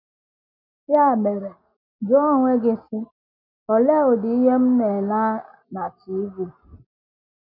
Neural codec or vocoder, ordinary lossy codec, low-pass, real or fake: none; none; 5.4 kHz; real